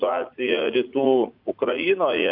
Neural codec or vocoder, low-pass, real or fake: vocoder, 44.1 kHz, 80 mel bands, Vocos; 5.4 kHz; fake